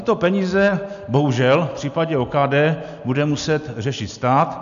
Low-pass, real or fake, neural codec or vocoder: 7.2 kHz; real; none